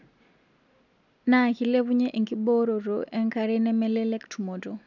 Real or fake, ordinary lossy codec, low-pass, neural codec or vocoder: real; none; 7.2 kHz; none